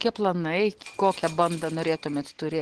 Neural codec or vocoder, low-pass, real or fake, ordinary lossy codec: none; 10.8 kHz; real; Opus, 16 kbps